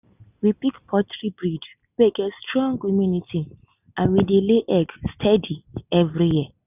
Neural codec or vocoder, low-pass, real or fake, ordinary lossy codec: none; 3.6 kHz; real; none